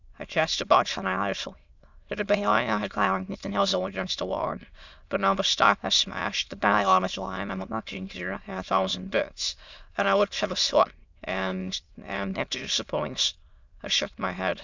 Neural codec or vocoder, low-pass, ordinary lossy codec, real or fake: autoencoder, 22.05 kHz, a latent of 192 numbers a frame, VITS, trained on many speakers; 7.2 kHz; Opus, 64 kbps; fake